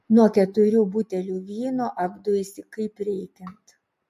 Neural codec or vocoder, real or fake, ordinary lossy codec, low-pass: none; real; MP3, 64 kbps; 14.4 kHz